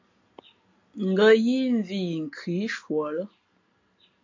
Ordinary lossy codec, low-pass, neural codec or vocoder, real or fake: AAC, 48 kbps; 7.2 kHz; none; real